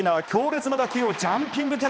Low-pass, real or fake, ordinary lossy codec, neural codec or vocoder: none; fake; none; codec, 16 kHz, 2 kbps, X-Codec, HuBERT features, trained on balanced general audio